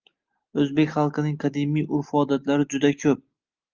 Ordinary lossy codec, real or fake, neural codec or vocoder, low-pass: Opus, 32 kbps; real; none; 7.2 kHz